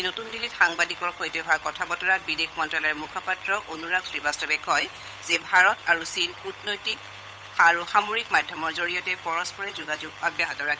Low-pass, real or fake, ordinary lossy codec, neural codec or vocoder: none; fake; none; codec, 16 kHz, 8 kbps, FunCodec, trained on Chinese and English, 25 frames a second